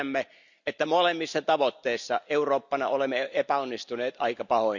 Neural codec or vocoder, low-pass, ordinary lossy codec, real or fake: none; 7.2 kHz; none; real